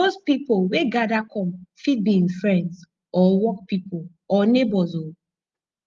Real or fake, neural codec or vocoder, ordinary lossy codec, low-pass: real; none; Opus, 32 kbps; 7.2 kHz